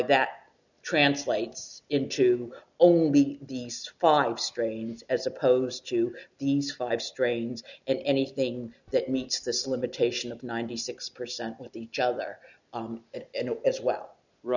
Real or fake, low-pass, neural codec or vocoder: real; 7.2 kHz; none